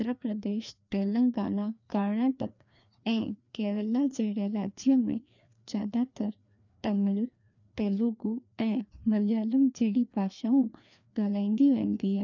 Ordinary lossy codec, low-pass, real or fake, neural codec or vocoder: none; 7.2 kHz; fake; codec, 16 kHz, 2 kbps, FreqCodec, larger model